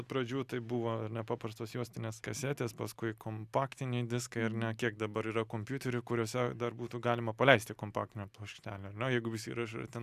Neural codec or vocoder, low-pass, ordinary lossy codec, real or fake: none; 14.4 kHz; Opus, 64 kbps; real